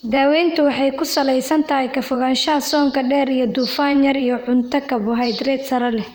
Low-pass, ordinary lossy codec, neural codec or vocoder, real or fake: none; none; vocoder, 44.1 kHz, 128 mel bands, Pupu-Vocoder; fake